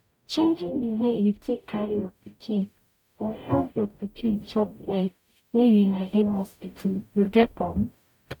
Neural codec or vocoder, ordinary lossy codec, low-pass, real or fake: codec, 44.1 kHz, 0.9 kbps, DAC; none; 19.8 kHz; fake